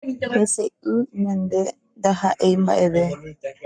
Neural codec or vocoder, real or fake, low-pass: codec, 44.1 kHz, 7.8 kbps, Pupu-Codec; fake; 9.9 kHz